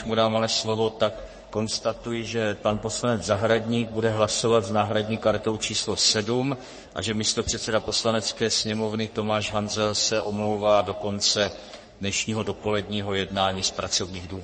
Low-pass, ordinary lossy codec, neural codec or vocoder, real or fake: 10.8 kHz; MP3, 32 kbps; codec, 44.1 kHz, 3.4 kbps, Pupu-Codec; fake